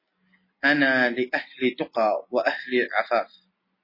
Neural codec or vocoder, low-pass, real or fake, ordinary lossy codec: none; 5.4 kHz; real; MP3, 24 kbps